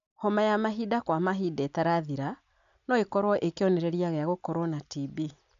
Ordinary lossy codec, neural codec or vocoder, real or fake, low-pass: none; none; real; 7.2 kHz